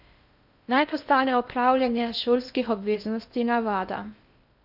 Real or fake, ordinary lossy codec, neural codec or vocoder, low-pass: fake; none; codec, 16 kHz in and 24 kHz out, 0.6 kbps, FocalCodec, streaming, 2048 codes; 5.4 kHz